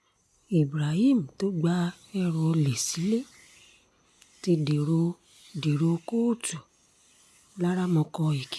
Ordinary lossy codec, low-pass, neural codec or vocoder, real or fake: none; none; none; real